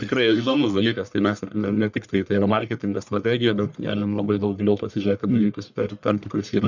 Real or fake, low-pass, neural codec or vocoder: fake; 7.2 kHz; codec, 44.1 kHz, 1.7 kbps, Pupu-Codec